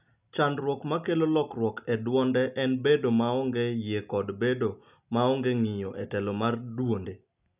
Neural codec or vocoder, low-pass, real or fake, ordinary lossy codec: none; 3.6 kHz; real; none